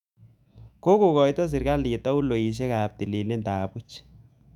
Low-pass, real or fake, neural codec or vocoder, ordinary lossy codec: 19.8 kHz; fake; autoencoder, 48 kHz, 128 numbers a frame, DAC-VAE, trained on Japanese speech; none